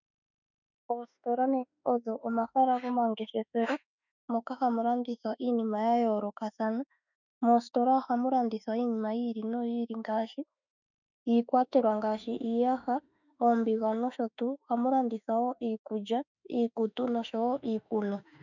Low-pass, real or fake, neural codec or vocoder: 7.2 kHz; fake; autoencoder, 48 kHz, 32 numbers a frame, DAC-VAE, trained on Japanese speech